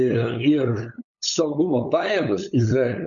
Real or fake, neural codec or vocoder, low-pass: fake; codec, 16 kHz, 8 kbps, FunCodec, trained on LibriTTS, 25 frames a second; 7.2 kHz